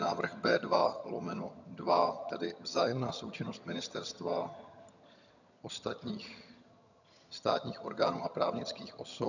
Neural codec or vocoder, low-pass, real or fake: vocoder, 22.05 kHz, 80 mel bands, HiFi-GAN; 7.2 kHz; fake